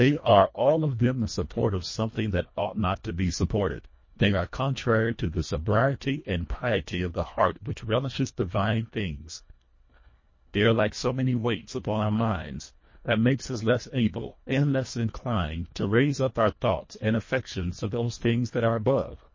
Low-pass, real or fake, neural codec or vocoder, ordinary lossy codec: 7.2 kHz; fake; codec, 24 kHz, 1.5 kbps, HILCodec; MP3, 32 kbps